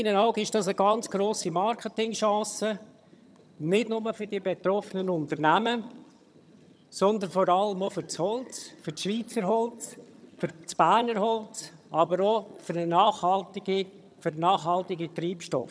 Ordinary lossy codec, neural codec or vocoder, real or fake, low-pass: none; vocoder, 22.05 kHz, 80 mel bands, HiFi-GAN; fake; none